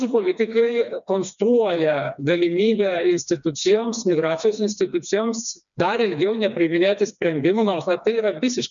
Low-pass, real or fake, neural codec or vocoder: 7.2 kHz; fake; codec, 16 kHz, 2 kbps, FreqCodec, smaller model